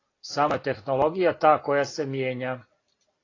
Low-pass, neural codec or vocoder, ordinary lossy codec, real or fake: 7.2 kHz; none; AAC, 32 kbps; real